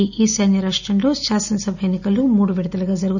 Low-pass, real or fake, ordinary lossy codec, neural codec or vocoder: 7.2 kHz; real; none; none